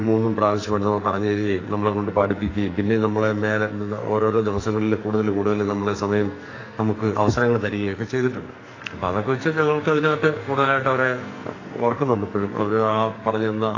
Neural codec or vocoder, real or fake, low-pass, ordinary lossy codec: codec, 44.1 kHz, 2.6 kbps, SNAC; fake; 7.2 kHz; AAC, 32 kbps